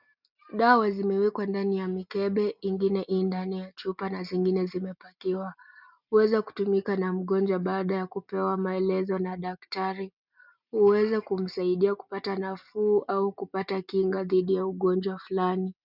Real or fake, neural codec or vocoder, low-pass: real; none; 5.4 kHz